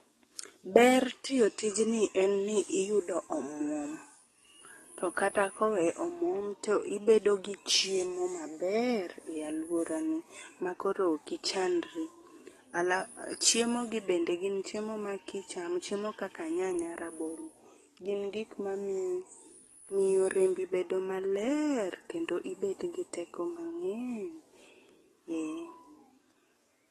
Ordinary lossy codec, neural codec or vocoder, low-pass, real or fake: AAC, 32 kbps; codec, 44.1 kHz, 7.8 kbps, DAC; 19.8 kHz; fake